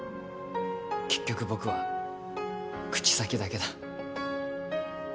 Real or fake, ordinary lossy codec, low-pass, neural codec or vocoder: real; none; none; none